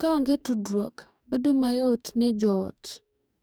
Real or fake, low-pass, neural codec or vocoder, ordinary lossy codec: fake; none; codec, 44.1 kHz, 2.6 kbps, DAC; none